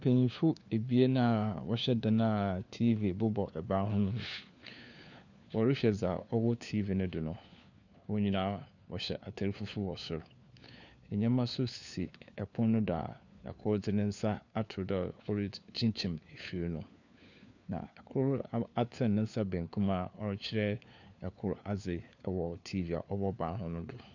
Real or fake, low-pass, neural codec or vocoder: fake; 7.2 kHz; codec, 16 kHz, 4 kbps, FunCodec, trained on LibriTTS, 50 frames a second